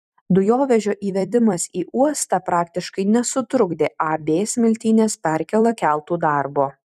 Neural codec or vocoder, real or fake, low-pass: vocoder, 44.1 kHz, 128 mel bands every 256 samples, BigVGAN v2; fake; 14.4 kHz